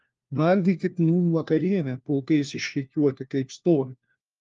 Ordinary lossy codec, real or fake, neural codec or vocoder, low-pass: Opus, 32 kbps; fake; codec, 16 kHz, 1 kbps, FunCodec, trained on LibriTTS, 50 frames a second; 7.2 kHz